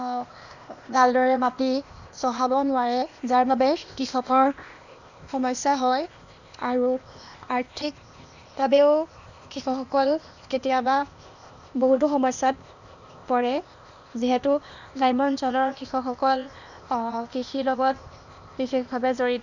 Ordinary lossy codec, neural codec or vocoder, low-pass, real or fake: none; codec, 16 kHz, 0.8 kbps, ZipCodec; 7.2 kHz; fake